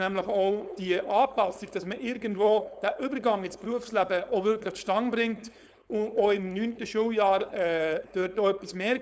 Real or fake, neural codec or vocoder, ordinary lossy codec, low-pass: fake; codec, 16 kHz, 4.8 kbps, FACodec; none; none